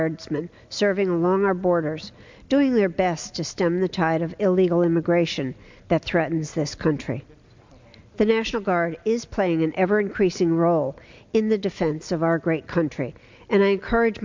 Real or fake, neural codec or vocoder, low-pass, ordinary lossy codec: real; none; 7.2 kHz; MP3, 64 kbps